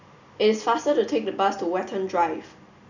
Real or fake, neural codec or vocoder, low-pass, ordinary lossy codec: real; none; 7.2 kHz; none